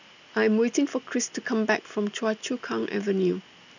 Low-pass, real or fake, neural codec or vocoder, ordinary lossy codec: 7.2 kHz; real; none; none